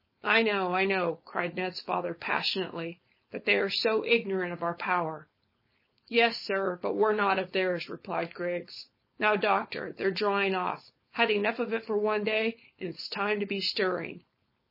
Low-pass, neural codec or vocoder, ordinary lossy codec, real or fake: 5.4 kHz; codec, 16 kHz, 4.8 kbps, FACodec; MP3, 24 kbps; fake